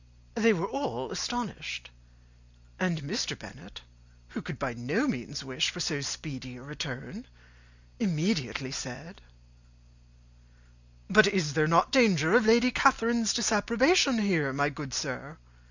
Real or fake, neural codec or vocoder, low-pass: real; none; 7.2 kHz